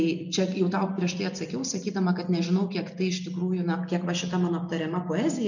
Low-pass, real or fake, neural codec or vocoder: 7.2 kHz; real; none